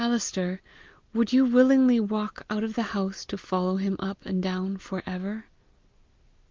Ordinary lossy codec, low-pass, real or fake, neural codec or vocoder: Opus, 32 kbps; 7.2 kHz; real; none